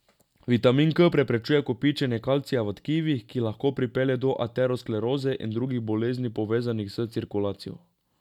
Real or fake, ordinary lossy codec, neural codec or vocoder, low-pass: real; none; none; 19.8 kHz